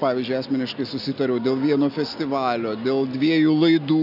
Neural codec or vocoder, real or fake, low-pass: none; real; 5.4 kHz